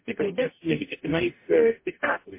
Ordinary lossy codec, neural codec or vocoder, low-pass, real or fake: MP3, 24 kbps; codec, 44.1 kHz, 0.9 kbps, DAC; 3.6 kHz; fake